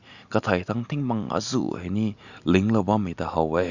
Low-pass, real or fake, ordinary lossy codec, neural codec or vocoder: 7.2 kHz; real; none; none